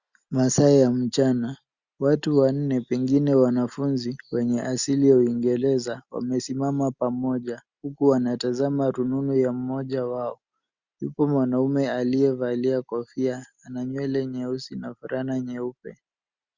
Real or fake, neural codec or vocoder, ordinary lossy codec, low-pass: real; none; Opus, 64 kbps; 7.2 kHz